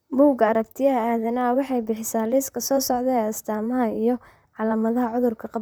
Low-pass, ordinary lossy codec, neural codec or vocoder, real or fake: none; none; vocoder, 44.1 kHz, 128 mel bands, Pupu-Vocoder; fake